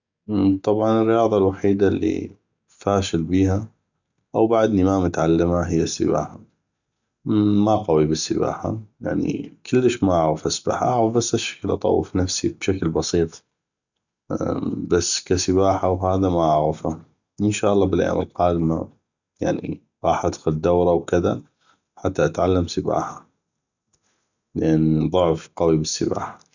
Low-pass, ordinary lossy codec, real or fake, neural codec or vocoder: 7.2 kHz; none; real; none